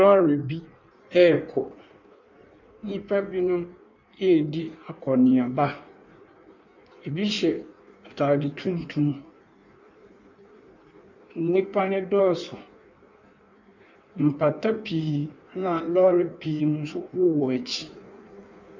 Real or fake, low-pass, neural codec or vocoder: fake; 7.2 kHz; codec, 16 kHz in and 24 kHz out, 1.1 kbps, FireRedTTS-2 codec